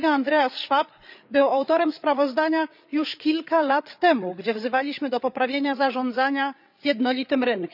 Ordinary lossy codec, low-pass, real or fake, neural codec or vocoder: none; 5.4 kHz; fake; codec, 16 kHz, 8 kbps, FreqCodec, larger model